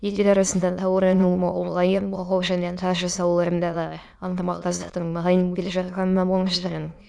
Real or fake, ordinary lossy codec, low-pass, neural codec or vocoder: fake; none; none; autoencoder, 22.05 kHz, a latent of 192 numbers a frame, VITS, trained on many speakers